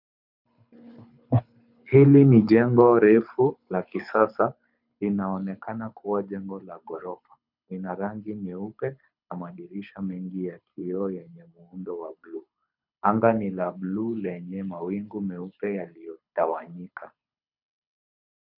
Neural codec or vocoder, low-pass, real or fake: codec, 24 kHz, 6 kbps, HILCodec; 5.4 kHz; fake